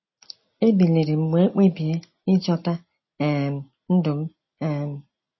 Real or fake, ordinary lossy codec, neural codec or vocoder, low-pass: real; MP3, 24 kbps; none; 7.2 kHz